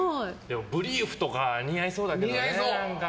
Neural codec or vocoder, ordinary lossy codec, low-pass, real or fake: none; none; none; real